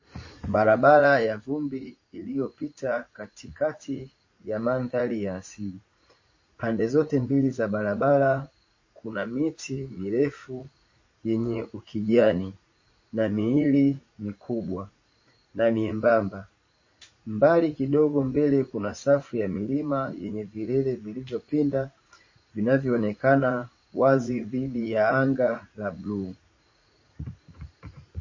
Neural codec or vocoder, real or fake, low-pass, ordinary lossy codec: vocoder, 44.1 kHz, 80 mel bands, Vocos; fake; 7.2 kHz; MP3, 32 kbps